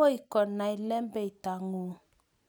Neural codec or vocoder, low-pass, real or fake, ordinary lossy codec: none; none; real; none